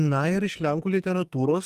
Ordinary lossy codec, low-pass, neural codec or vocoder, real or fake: Opus, 32 kbps; 14.4 kHz; codec, 44.1 kHz, 2.6 kbps, SNAC; fake